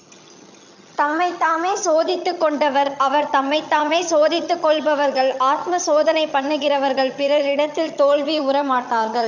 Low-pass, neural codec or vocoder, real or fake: 7.2 kHz; vocoder, 22.05 kHz, 80 mel bands, HiFi-GAN; fake